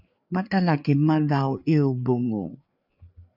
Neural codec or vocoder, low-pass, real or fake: codec, 16 kHz, 4 kbps, FreqCodec, larger model; 5.4 kHz; fake